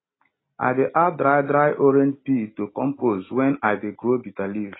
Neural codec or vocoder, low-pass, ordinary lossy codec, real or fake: none; 7.2 kHz; AAC, 16 kbps; real